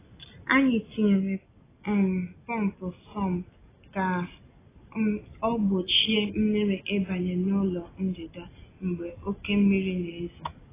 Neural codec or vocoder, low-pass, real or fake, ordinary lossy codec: none; 3.6 kHz; real; AAC, 16 kbps